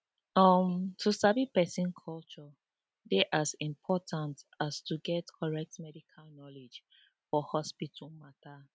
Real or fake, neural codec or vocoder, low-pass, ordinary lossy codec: real; none; none; none